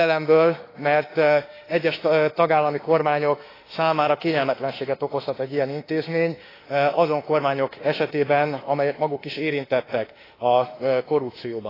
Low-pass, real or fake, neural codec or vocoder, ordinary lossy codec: 5.4 kHz; fake; autoencoder, 48 kHz, 32 numbers a frame, DAC-VAE, trained on Japanese speech; AAC, 24 kbps